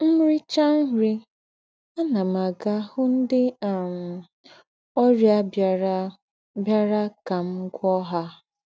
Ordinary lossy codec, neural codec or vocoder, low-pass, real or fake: none; none; none; real